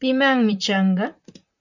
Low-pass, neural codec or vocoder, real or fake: 7.2 kHz; vocoder, 44.1 kHz, 128 mel bands, Pupu-Vocoder; fake